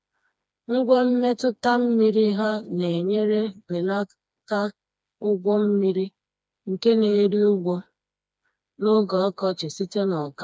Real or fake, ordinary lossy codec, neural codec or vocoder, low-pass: fake; none; codec, 16 kHz, 2 kbps, FreqCodec, smaller model; none